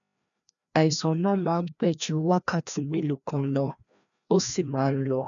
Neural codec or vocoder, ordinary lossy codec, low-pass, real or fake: codec, 16 kHz, 1 kbps, FreqCodec, larger model; none; 7.2 kHz; fake